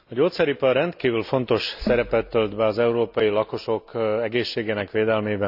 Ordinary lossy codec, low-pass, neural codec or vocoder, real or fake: none; 5.4 kHz; none; real